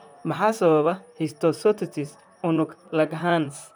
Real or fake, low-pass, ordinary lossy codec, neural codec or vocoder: fake; none; none; vocoder, 44.1 kHz, 128 mel bands, Pupu-Vocoder